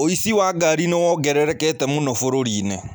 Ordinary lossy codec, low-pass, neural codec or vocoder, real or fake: none; none; none; real